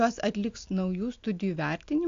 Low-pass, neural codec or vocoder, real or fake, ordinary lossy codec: 7.2 kHz; none; real; AAC, 48 kbps